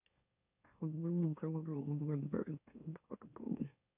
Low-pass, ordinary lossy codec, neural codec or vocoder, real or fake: 3.6 kHz; none; autoencoder, 44.1 kHz, a latent of 192 numbers a frame, MeloTTS; fake